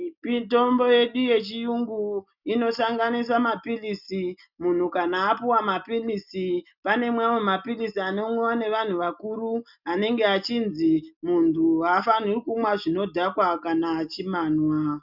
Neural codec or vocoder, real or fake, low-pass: none; real; 5.4 kHz